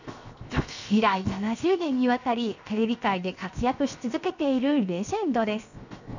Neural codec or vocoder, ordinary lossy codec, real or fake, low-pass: codec, 16 kHz, 0.7 kbps, FocalCodec; none; fake; 7.2 kHz